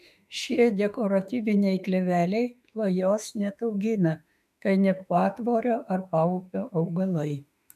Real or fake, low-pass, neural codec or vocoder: fake; 14.4 kHz; autoencoder, 48 kHz, 32 numbers a frame, DAC-VAE, trained on Japanese speech